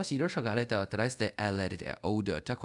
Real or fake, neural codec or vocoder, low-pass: fake; codec, 24 kHz, 0.5 kbps, DualCodec; 10.8 kHz